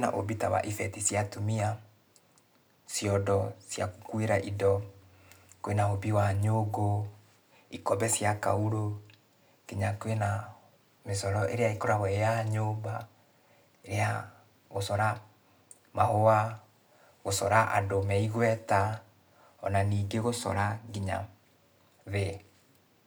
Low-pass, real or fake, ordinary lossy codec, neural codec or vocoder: none; real; none; none